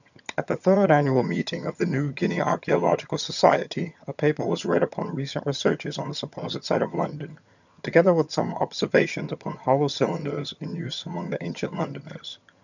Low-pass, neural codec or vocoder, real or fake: 7.2 kHz; vocoder, 22.05 kHz, 80 mel bands, HiFi-GAN; fake